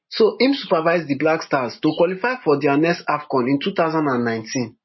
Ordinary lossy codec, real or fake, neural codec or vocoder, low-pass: MP3, 24 kbps; real; none; 7.2 kHz